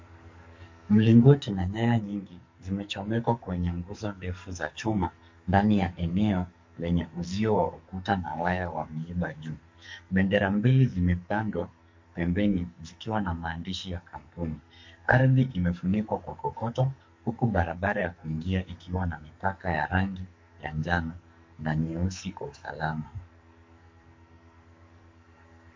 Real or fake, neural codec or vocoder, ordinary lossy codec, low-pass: fake; codec, 44.1 kHz, 2.6 kbps, SNAC; MP3, 48 kbps; 7.2 kHz